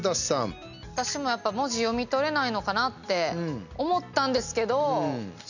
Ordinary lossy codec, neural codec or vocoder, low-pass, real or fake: none; none; 7.2 kHz; real